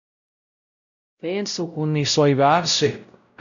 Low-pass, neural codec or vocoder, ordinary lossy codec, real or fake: 7.2 kHz; codec, 16 kHz, 0.5 kbps, X-Codec, WavLM features, trained on Multilingual LibriSpeech; none; fake